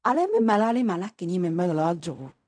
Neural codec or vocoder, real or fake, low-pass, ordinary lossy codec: codec, 16 kHz in and 24 kHz out, 0.4 kbps, LongCat-Audio-Codec, fine tuned four codebook decoder; fake; 9.9 kHz; none